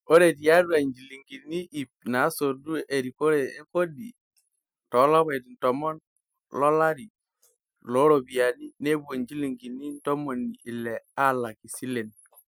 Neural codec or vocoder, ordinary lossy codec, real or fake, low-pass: none; none; real; none